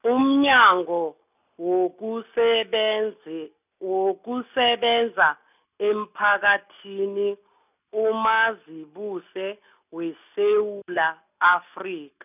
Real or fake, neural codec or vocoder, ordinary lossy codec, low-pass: real; none; none; 3.6 kHz